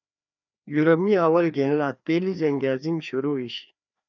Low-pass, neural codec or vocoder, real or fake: 7.2 kHz; codec, 16 kHz, 2 kbps, FreqCodec, larger model; fake